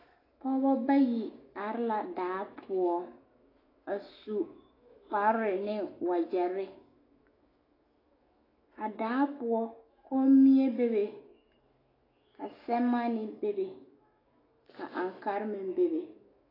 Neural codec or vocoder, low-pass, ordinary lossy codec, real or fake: autoencoder, 48 kHz, 128 numbers a frame, DAC-VAE, trained on Japanese speech; 5.4 kHz; AAC, 24 kbps; fake